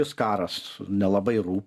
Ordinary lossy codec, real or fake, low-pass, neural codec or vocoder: Opus, 64 kbps; real; 14.4 kHz; none